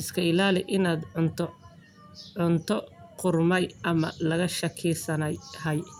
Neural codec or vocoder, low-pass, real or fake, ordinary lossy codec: none; none; real; none